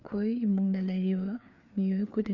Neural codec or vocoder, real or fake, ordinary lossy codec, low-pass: codec, 16 kHz, 4 kbps, FreqCodec, larger model; fake; none; 7.2 kHz